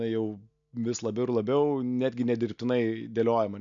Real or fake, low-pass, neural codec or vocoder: real; 7.2 kHz; none